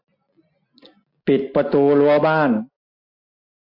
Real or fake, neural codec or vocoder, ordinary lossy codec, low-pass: real; none; MP3, 32 kbps; 5.4 kHz